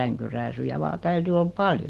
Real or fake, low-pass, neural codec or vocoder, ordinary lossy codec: real; 14.4 kHz; none; Opus, 16 kbps